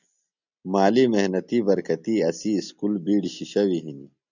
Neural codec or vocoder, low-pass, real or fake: none; 7.2 kHz; real